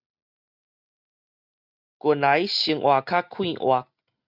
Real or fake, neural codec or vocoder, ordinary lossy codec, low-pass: real; none; Opus, 64 kbps; 5.4 kHz